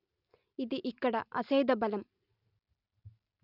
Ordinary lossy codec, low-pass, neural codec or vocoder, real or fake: none; 5.4 kHz; none; real